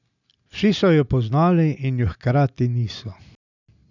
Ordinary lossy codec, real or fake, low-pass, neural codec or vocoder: none; real; 7.2 kHz; none